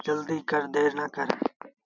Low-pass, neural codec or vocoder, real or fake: 7.2 kHz; none; real